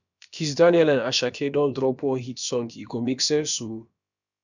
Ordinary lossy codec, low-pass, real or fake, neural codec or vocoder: none; 7.2 kHz; fake; codec, 16 kHz, about 1 kbps, DyCAST, with the encoder's durations